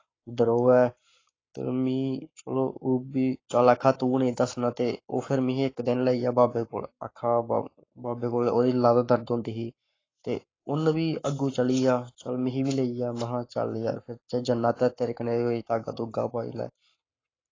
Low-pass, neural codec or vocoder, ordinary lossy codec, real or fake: 7.2 kHz; codec, 44.1 kHz, 7.8 kbps, Pupu-Codec; AAC, 32 kbps; fake